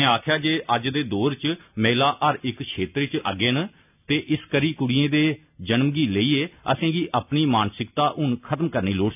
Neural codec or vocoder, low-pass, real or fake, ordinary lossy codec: none; 3.6 kHz; real; none